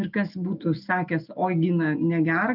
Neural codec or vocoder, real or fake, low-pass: none; real; 5.4 kHz